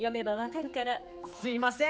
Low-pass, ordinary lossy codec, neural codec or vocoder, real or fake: none; none; codec, 16 kHz, 4 kbps, X-Codec, HuBERT features, trained on general audio; fake